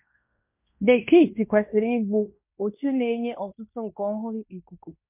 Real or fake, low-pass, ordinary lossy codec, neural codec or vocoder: fake; 3.6 kHz; MP3, 32 kbps; codec, 16 kHz in and 24 kHz out, 0.9 kbps, LongCat-Audio-Codec, fine tuned four codebook decoder